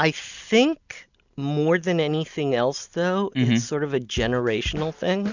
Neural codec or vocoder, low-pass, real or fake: none; 7.2 kHz; real